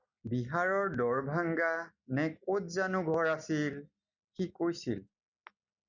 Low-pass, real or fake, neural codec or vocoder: 7.2 kHz; real; none